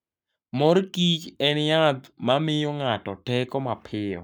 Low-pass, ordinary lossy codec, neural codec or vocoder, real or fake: 19.8 kHz; none; codec, 44.1 kHz, 7.8 kbps, Pupu-Codec; fake